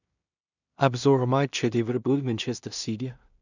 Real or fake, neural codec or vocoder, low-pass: fake; codec, 16 kHz in and 24 kHz out, 0.4 kbps, LongCat-Audio-Codec, two codebook decoder; 7.2 kHz